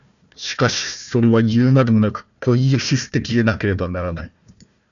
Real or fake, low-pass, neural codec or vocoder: fake; 7.2 kHz; codec, 16 kHz, 1 kbps, FunCodec, trained on Chinese and English, 50 frames a second